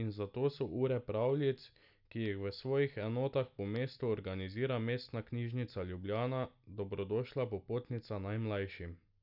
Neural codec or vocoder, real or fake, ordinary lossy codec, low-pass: none; real; none; 5.4 kHz